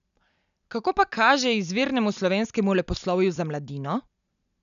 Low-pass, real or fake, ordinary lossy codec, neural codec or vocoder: 7.2 kHz; real; none; none